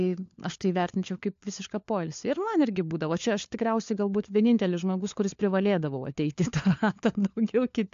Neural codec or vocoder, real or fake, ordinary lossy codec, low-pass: codec, 16 kHz, 4 kbps, FunCodec, trained on LibriTTS, 50 frames a second; fake; AAC, 64 kbps; 7.2 kHz